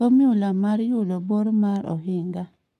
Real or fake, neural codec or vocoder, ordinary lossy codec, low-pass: fake; codec, 44.1 kHz, 7.8 kbps, Pupu-Codec; none; 14.4 kHz